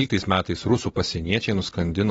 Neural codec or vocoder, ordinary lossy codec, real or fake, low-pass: none; AAC, 24 kbps; real; 14.4 kHz